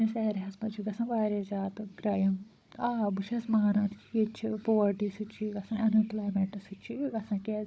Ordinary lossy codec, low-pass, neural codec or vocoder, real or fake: none; none; codec, 16 kHz, 16 kbps, FunCodec, trained on Chinese and English, 50 frames a second; fake